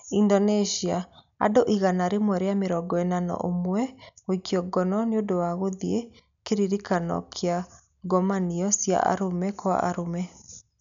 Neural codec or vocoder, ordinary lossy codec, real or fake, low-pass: none; none; real; 7.2 kHz